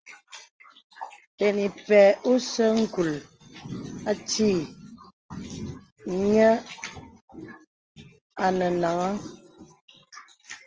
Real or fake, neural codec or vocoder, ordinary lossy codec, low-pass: real; none; Opus, 24 kbps; 7.2 kHz